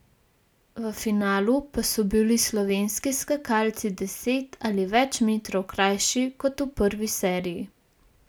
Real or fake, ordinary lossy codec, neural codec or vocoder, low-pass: real; none; none; none